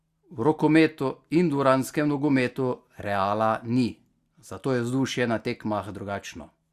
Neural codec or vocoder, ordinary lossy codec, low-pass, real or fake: none; Opus, 64 kbps; 14.4 kHz; real